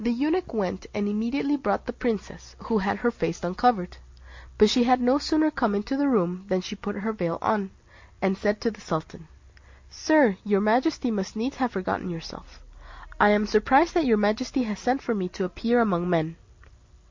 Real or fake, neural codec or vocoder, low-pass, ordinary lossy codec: real; none; 7.2 kHz; MP3, 48 kbps